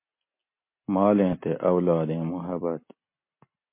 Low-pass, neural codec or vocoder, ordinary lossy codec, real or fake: 3.6 kHz; none; MP3, 24 kbps; real